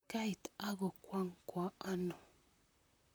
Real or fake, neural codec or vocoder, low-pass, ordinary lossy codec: fake; vocoder, 44.1 kHz, 128 mel bands, Pupu-Vocoder; none; none